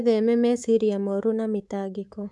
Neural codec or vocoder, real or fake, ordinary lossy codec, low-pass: codec, 24 kHz, 3.1 kbps, DualCodec; fake; none; none